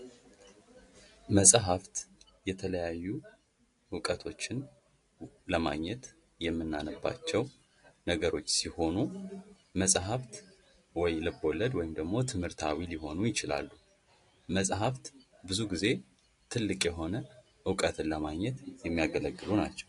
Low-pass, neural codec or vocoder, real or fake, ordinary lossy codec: 10.8 kHz; none; real; AAC, 48 kbps